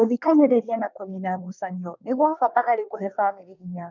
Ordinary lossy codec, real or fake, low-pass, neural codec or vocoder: none; fake; 7.2 kHz; codec, 24 kHz, 1 kbps, SNAC